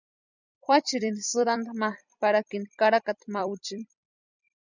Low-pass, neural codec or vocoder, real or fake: 7.2 kHz; vocoder, 44.1 kHz, 128 mel bands every 256 samples, BigVGAN v2; fake